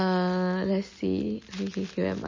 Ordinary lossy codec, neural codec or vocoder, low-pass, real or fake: MP3, 32 kbps; none; 7.2 kHz; real